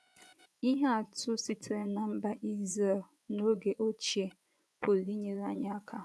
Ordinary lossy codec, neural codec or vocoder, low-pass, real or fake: none; vocoder, 24 kHz, 100 mel bands, Vocos; none; fake